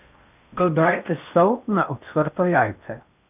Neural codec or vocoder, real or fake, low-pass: codec, 16 kHz in and 24 kHz out, 0.6 kbps, FocalCodec, streaming, 4096 codes; fake; 3.6 kHz